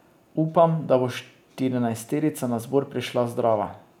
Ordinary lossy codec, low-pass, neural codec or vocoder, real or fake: none; 19.8 kHz; none; real